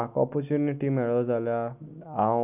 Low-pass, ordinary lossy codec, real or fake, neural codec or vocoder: 3.6 kHz; none; real; none